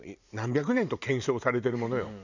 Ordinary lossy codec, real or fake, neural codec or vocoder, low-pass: none; fake; vocoder, 44.1 kHz, 128 mel bands every 512 samples, BigVGAN v2; 7.2 kHz